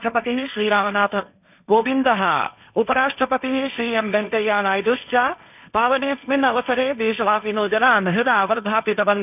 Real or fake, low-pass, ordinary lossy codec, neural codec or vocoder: fake; 3.6 kHz; none; codec, 16 kHz, 1.1 kbps, Voila-Tokenizer